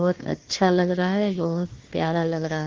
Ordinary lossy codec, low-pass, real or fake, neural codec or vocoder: Opus, 16 kbps; 7.2 kHz; fake; codec, 16 kHz, 1 kbps, FunCodec, trained on Chinese and English, 50 frames a second